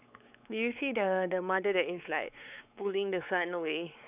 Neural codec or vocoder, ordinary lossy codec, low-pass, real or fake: codec, 16 kHz, 4 kbps, X-Codec, WavLM features, trained on Multilingual LibriSpeech; none; 3.6 kHz; fake